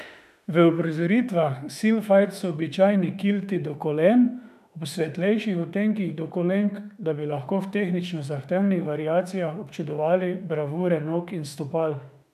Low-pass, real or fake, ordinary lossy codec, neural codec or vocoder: 14.4 kHz; fake; none; autoencoder, 48 kHz, 32 numbers a frame, DAC-VAE, trained on Japanese speech